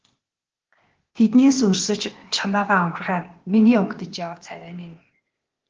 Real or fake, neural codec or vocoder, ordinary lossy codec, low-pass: fake; codec, 16 kHz, 0.8 kbps, ZipCodec; Opus, 16 kbps; 7.2 kHz